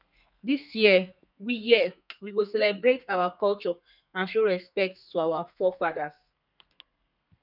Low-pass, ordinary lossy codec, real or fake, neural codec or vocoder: 5.4 kHz; none; fake; codec, 32 kHz, 1.9 kbps, SNAC